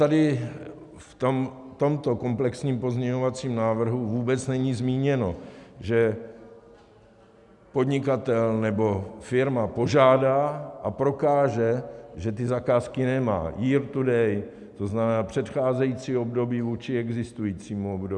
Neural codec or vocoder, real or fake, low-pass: none; real; 10.8 kHz